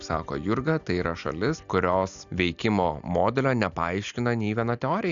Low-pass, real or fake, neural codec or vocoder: 7.2 kHz; real; none